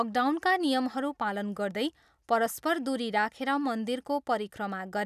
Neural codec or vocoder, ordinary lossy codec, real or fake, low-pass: none; none; real; 14.4 kHz